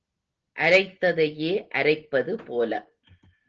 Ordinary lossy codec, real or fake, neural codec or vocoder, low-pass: Opus, 16 kbps; real; none; 7.2 kHz